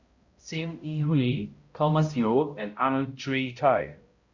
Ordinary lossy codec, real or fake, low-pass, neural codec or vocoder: Opus, 64 kbps; fake; 7.2 kHz; codec, 16 kHz, 0.5 kbps, X-Codec, HuBERT features, trained on balanced general audio